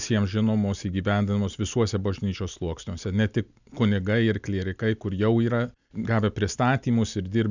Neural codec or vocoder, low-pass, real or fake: none; 7.2 kHz; real